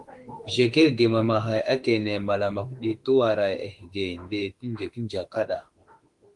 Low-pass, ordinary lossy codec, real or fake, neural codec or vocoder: 10.8 kHz; Opus, 24 kbps; fake; autoencoder, 48 kHz, 32 numbers a frame, DAC-VAE, trained on Japanese speech